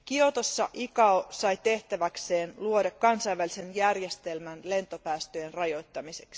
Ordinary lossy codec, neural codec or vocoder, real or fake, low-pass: none; none; real; none